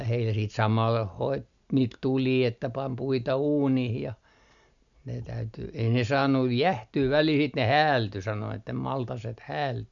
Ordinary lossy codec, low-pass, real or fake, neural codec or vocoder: none; 7.2 kHz; real; none